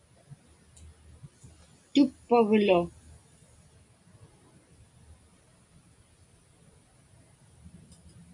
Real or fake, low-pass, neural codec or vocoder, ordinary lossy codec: real; 10.8 kHz; none; AAC, 64 kbps